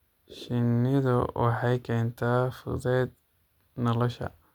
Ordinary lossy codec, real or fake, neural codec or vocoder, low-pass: none; real; none; 19.8 kHz